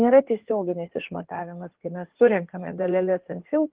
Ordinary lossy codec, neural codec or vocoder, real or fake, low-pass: Opus, 16 kbps; codec, 16 kHz in and 24 kHz out, 2.2 kbps, FireRedTTS-2 codec; fake; 3.6 kHz